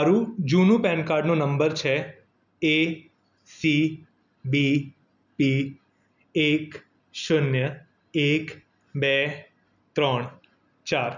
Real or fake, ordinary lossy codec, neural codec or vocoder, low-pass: real; none; none; 7.2 kHz